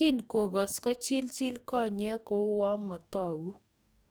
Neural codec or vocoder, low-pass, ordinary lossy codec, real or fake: codec, 44.1 kHz, 2.6 kbps, DAC; none; none; fake